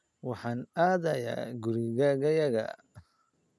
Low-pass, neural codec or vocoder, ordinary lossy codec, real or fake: none; none; none; real